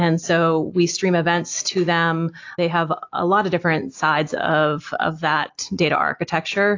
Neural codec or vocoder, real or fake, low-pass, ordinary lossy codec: none; real; 7.2 kHz; AAC, 48 kbps